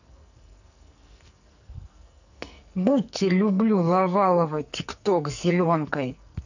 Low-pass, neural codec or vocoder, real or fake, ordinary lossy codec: 7.2 kHz; codec, 44.1 kHz, 2.6 kbps, SNAC; fake; none